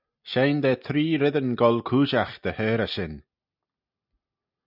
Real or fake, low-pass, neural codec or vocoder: real; 5.4 kHz; none